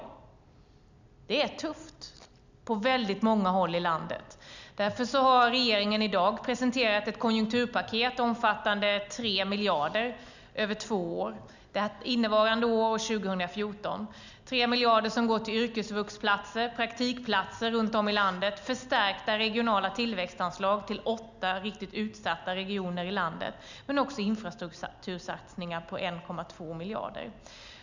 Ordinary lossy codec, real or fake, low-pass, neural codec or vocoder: none; real; 7.2 kHz; none